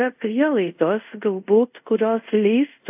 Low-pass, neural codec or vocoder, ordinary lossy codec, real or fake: 3.6 kHz; codec, 24 kHz, 0.5 kbps, DualCodec; AAC, 32 kbps; fake